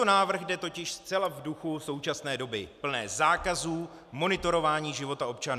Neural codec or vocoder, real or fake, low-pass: none; real; 14.4 kHz